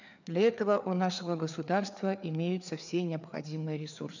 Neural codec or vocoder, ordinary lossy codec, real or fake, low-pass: codec, 16 kHz, 4 kbps, FreqCodec, larger model; none; fake; 7.2 kHz